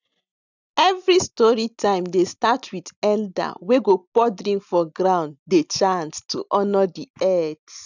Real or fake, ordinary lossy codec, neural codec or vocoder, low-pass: real; none; none; 7.2 kHz